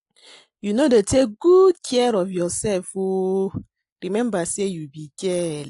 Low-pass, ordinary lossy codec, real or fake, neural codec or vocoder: 10.8 kHz; AAC, 48 kbps; real; none